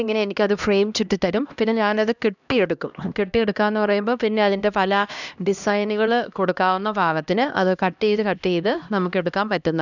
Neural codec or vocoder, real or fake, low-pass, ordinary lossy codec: codec, 16 kHz, 1 kbps, X-Codec, HuBERT features, trained on LibriSpeech; fake; 7.2 kHz; none